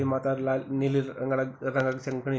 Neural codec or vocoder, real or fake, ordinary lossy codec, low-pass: none; real; none; none